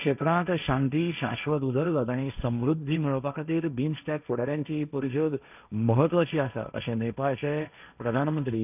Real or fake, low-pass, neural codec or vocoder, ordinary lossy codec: fake; 3.6 kHz; codec, 16 kHz, 1.1 kbps, Voila-Tokenizer; none